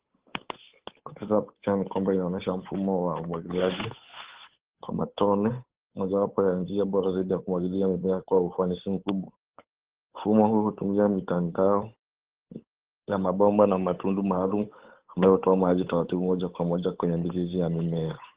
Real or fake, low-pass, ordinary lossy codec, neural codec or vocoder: fake; 3.6 kHz; Opus, 16 kbps; codec, 16 kHz, 8 kbps, FunCodec, trained on Chinese and English, 25 frames a second